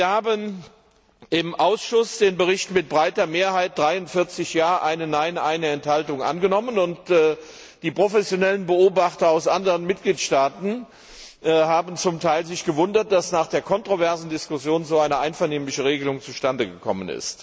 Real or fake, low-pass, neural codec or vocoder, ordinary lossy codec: real; none; none; none